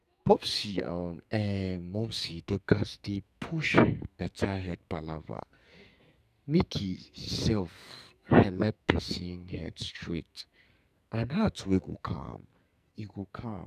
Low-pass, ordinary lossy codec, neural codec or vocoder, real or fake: 14.4 kHz; none; codec, 44.1 kHz, 2.6 kbps, SNAC; fake